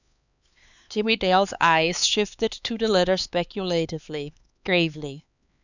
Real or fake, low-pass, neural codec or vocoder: fake; 7.2 kHz; codec, 16 kHz, 4 kbps, X-Codec, HuBERT features, trained on LibriSpeech